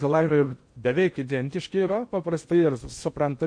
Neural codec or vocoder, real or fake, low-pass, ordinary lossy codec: codec, 16 kHz in and 24 kHz out, 0.8 kbps, FocalCodec, streaming, 65536 codes; fake; 9.9 kHz; MP3, 48 kbps